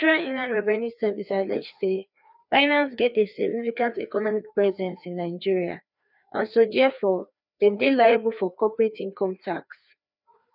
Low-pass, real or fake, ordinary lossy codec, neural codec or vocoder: 5.4 kHz; fake; none; codec, 16 kHz, 2 kbps, FreqCodec, larger model